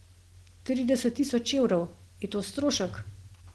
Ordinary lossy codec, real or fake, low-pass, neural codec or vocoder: Opus, 16 kbps; real; 10.8 kHz; none